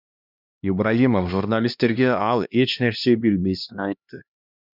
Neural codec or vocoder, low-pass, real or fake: codec, 16 kHz, 1 kbps, X-Codec, HuBERT features, trained on LibriSpeech; 5.4 kHz; fake